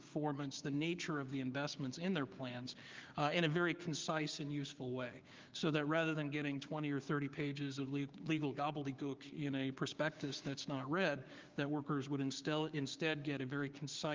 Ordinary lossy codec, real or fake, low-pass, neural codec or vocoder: Opus, 32 kbps; fake; 7.2 kHz; codec, 16 kHz, 6 kbps, DAC